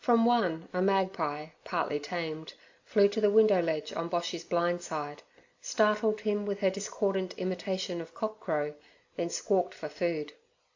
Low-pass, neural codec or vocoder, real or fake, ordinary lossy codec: 7.2 kHz; none; real; AAC, 48 kbps